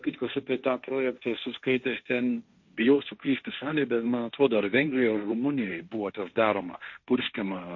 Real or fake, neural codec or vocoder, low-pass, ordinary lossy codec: fake; codec, 16 kHz, 1.1 kbps, Voila-Tokenizer; 7.2 kHz; MP3, 48 kbps